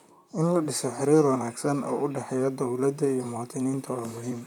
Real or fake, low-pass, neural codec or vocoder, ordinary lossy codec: fake; 19.8 kHz; vocoder, 44.1 kHz, 128 mel bands, Pupu-Vocoder; none